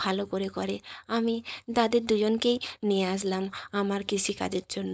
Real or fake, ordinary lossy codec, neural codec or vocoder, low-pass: fake; none; codec, 16 kHz, 4.8 kbps, FACodec; none